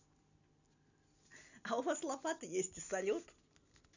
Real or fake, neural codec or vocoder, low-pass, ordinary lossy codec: real; none; 7.2 kHz; none